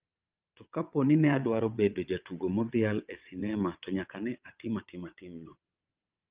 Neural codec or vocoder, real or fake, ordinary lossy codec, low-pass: vocoder, 22.05 kHz, 80 mel bands, WaveNeXt; fake; Opus, 24 kbps; 3.6 kHz